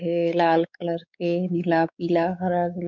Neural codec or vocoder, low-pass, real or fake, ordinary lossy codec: codec, 16 kHz, 4 kbps, X-Codec, WavLM features, trained on Multilingual LibriSpeech; 7.2 kHz; fake; none